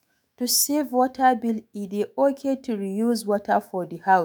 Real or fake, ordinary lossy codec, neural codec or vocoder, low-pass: fake; none; autoencoder, 48 kHz, 128 numbers a frame, DAC-VAE, trained on Japanese speech; none